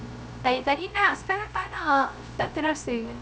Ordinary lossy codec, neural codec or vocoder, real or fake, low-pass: none; codec, 16 kHz, 0.3 kbps, FocalCodec; fake; none